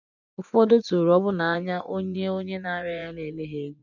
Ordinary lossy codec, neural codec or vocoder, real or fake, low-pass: none; vocoder, 24 kHz, 100 mel bands, Vocos; fake; 7.2 kHz